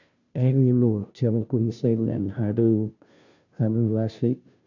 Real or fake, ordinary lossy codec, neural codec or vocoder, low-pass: fake; none; codec, 16 kHz, 0.5 kbps, FunCodec, trained on Chinese and English, 25 frames a second; 7.2 kHz